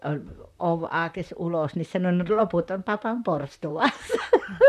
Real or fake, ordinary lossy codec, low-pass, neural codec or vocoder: fake; none; 14.4 kHz; vocoder, 44.1 kHz, 128 mel bands, Pupu-Vocoder